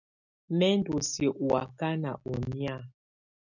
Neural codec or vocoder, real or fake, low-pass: none; real; 7.2 kHz